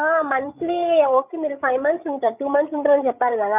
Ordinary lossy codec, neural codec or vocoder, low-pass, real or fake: none; codec, 16 kHz, 8 kbps, FreqCodec, larger model; 3.6 kHz; fake